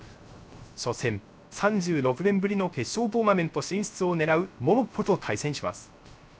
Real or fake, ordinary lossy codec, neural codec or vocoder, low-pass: fake; none; codec, 16 kHz, 0.3 kbps, FocalCodec; none